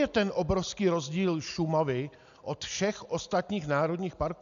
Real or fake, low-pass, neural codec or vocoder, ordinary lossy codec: real; 7.2 kHz; none; AAC, 96 kbps